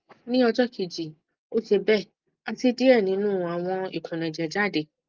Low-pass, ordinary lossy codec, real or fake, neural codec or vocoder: 7.2 kHz; Opus, 32 kbps; real; none